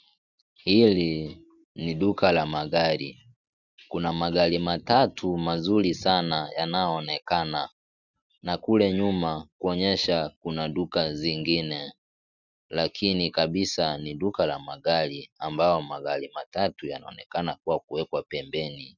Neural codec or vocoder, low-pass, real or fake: none; 7.2 kHz; real